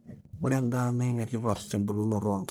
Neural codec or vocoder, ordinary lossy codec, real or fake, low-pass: codec, 44.1 kHz, 1.7 kbps, Pupu-Codec; none; fake; none